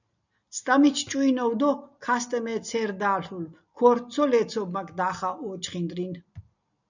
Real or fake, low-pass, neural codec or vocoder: real; 7.2 kHz; none